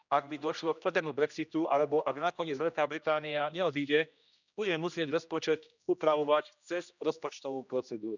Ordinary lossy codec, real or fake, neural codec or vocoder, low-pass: none; fake; codec, 16 kHz, 1 kbps, X-Codec, HuBERT features, trained on general audio; 7.2 kHz